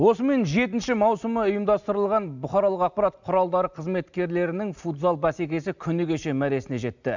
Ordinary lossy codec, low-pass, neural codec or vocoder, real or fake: none; 7.2 kHz; none; real